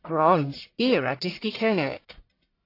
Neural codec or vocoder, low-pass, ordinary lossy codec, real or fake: codec, 44.1 kHz, 1.7 kbps, Pupu-Codec; 5.4 kHz; AAC, 32 kbps; fake